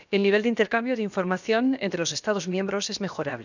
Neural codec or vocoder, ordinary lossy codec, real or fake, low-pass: codec, 16 kHz, about 1 kbps, DyCAST, with the encoder's durations; none; fake; 7.2 kHz